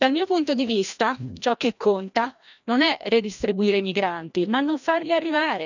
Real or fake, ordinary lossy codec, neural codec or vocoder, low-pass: fake; none; codec, 16 kHz, 1 kbps, FreqCodec, larger model; 7.2 kHz